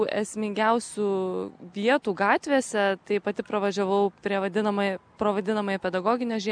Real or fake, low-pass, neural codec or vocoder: real; 9.9 kHz; none